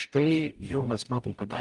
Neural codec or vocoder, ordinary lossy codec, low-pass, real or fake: codec, 44.1 kHz, 0.9 kbps, DAC; Opus, 32 kbps; 10.8 kHz; fake